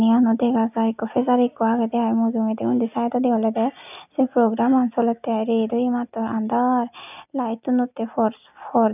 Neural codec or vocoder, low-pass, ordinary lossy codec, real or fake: none; 3.6 kHz; none; real